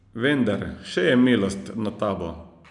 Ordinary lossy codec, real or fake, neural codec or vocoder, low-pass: none; real; none; 10.8 kHz